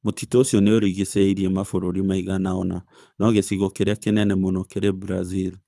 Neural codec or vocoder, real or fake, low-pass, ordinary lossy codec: codec, 24 kHz, 6 kbps, HILCodec; fake; none; none